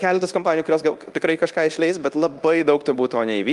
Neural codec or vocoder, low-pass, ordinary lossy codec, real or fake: codec, 24 kHz, 0.9 kbps, DualCodec; 10.8 kHz; Opus, 32 kbps; fake